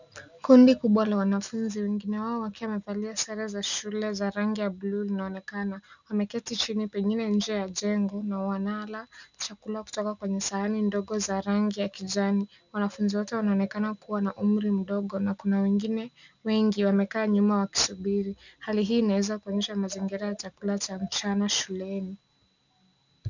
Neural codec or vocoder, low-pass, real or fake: none; 7.2 kHz; real